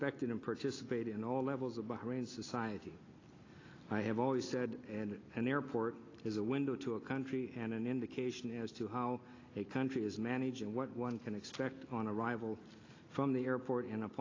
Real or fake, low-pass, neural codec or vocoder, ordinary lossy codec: real; 7.2 kHz; none; AAC, 32 kbps